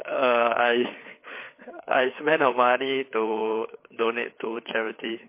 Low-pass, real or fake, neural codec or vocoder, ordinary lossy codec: 3.6 kHz; fake; codec, 16 kHz, 4 kbps, FreqCodec, larger model; MP3, 24 kbps